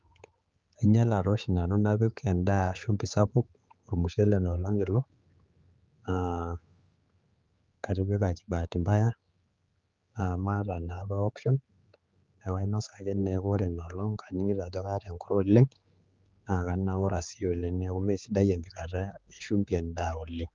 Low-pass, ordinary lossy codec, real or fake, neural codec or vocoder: 7.2 kHz; Opus, 32 kbps; fake; codec, 16 kHz, 4 kbps, X-Codec, HuBERT features, trained on general audio